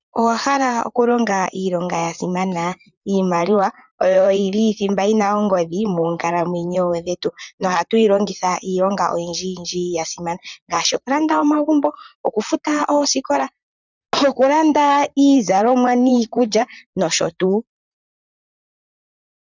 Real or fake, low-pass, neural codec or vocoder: fake; 7.2 kHz; vocoder, 44.1 kHz, 128 mel bands, Pupu-Vocoder